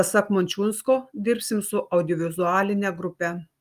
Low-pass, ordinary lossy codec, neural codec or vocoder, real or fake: 14.4 kHz; Opus, 32 kbps; none; real